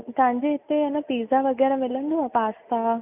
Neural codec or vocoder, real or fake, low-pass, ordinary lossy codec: none; real; 3.6 kHz; none